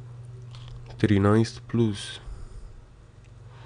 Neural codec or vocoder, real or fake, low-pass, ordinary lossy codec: none; real; 9.9 kHz; none